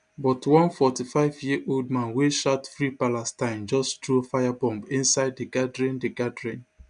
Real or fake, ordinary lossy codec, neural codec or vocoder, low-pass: real; none; none; 9.9 kHz